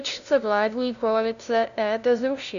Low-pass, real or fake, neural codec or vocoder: 7.2 kHz; fake; codec, 16 kHz, 0.5 kbps, FunCodec, trained on LibriTTS, 25 frames a second